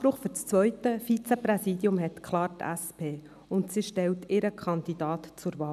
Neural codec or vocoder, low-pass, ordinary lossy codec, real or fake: none; 14.4 kHz; none; real